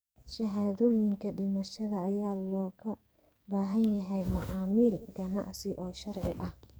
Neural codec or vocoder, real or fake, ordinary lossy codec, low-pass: codec, 44.1 kHz, 2.6 kbps, SNAC; fake; none; none